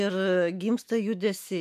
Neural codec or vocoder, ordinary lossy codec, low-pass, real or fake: autoencoder, 48 kHz, 128 numbers a frame, DAC-VAE, trained on Japanese speech; MP3, 64 kbps; 14.4 kHz; fake